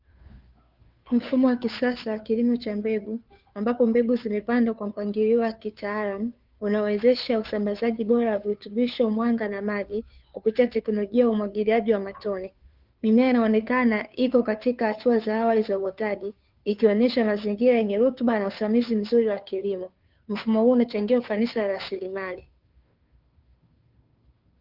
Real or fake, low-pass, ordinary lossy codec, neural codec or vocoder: fake; 5.4 kHz; Opus, 24 kbps; codec, 16 kHz, 2 kbps, FunCodec, trained on Chinese and English, 25 frames a second